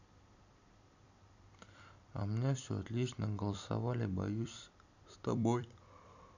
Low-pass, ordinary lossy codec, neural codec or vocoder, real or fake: 7.2 kHz; none; vocoder, 44.1 kHz, 128 mel bands every 256 samples, BigVGAN v2; fake